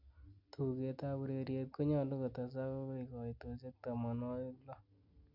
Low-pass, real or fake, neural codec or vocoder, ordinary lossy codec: 5.4 kHz; real; none; none